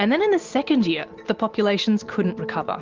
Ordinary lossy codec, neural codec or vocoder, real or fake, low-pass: Opus, 24 kbps; none; real; 7.2 kHz